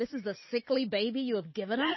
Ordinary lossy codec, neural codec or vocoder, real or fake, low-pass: MP3, 24 kbps; none; real; 7.2 kHz